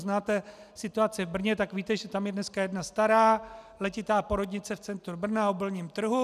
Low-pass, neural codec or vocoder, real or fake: 14.4 kHz; none; real